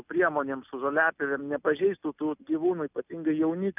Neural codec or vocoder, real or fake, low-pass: none; real; 3.6 kHz